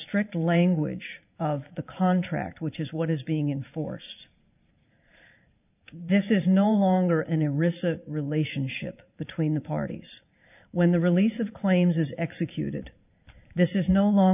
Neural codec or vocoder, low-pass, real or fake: vocoder, 44.1 kHz, 128 mel bands every 512 samples, BigVGAN v2; 3.6 kHz; fake